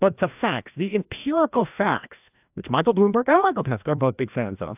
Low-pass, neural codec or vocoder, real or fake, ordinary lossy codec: 3.6 kHz; codec, 16 kHz, 1 kbps, FreqCodec, larger model; fake; AAC, 32 kbps